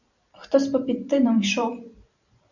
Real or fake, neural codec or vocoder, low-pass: fake; vocoder, 44.1 kHz, 128 mel bands every 512 samples, BigVGAN v2; 7.2 kHz